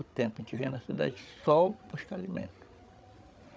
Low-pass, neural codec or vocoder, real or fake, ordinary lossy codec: none; codec, 16 kHz, 16 kbps, FreqCodec, larger model; fake; none